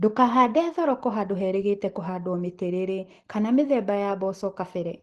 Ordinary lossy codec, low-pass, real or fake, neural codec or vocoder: Opus, 16 kbps; 10.8 kHz; real; none